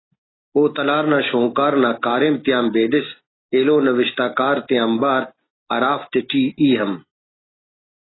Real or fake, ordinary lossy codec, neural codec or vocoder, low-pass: real; AAC, 16 kbps; none; 7.2 kHz